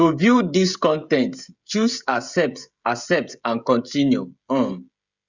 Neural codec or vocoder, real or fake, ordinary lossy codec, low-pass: codec, 16 kHz, 16 kbps, FreqCodec, smaller model; fake; Opus, 64 kbps; 7.2 kHz